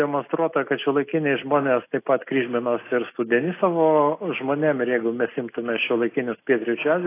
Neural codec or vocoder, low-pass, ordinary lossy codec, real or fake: none; 3.6 kHz; AAC, 24 kbps; real